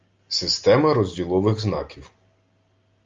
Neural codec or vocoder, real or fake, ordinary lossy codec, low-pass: none; real; Opus, 32 kbps; 7.2 kHz